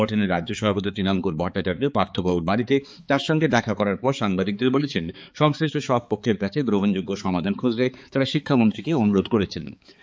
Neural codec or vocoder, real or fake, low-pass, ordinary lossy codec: codec, 16 kHz, 4 kbps, X-Codec, HuBERT features, trained on balanced general audio; fake; none; none